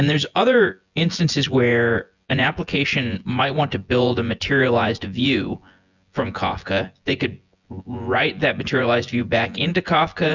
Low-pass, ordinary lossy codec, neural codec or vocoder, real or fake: 7.2 kHz; Opus, 64 kbps; vocoder, 24 kHz, 100 mel bands, Vocos; fake